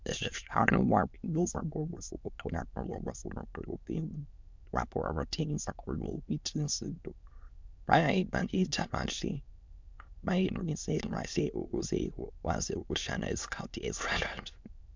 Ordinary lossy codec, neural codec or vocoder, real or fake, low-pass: MP3, 64 kbps; autoencoder, 22.05 kHz, a latent of 192 numbers a frame, VITS, trained on many speakers; fake; 7.2 kHz